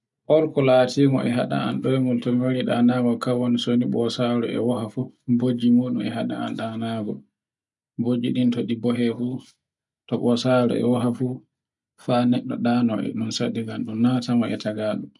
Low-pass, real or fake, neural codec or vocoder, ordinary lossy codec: 10.8 kHz; real; none; none